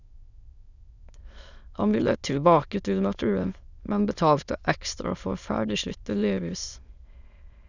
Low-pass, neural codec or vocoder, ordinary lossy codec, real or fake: 7.2 kHz; autoencoder, 22.05 kHz, a latent of 192 numbers a frame, VITS, trained on many speakers; none; fake